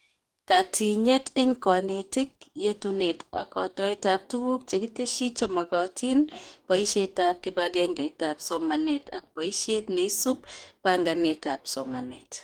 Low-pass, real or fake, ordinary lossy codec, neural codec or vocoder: 19.8 kHz; fake; Opus, 32 kbps; codec, 44.1 kHz, 2.6 kbps, DAC